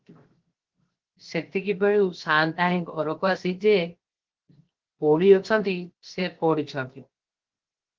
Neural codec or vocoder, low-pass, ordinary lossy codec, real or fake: codec, 16 kHz, 0.7 kbps, FocalCodec; 7.2 kHz; Opus, 16 kbps; fake